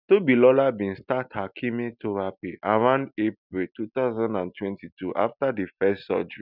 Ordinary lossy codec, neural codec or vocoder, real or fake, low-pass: none; none; real; 5.4 kHz